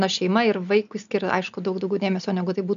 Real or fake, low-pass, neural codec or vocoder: real; 7.2 kHz; none